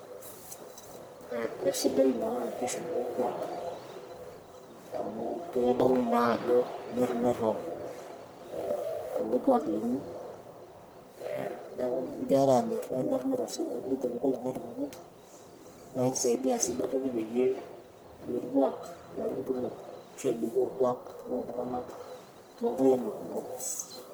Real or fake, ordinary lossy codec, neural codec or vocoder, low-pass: fake; none; codec, 44.1 kHz, 1.7 kbps, Pupu-Codec; none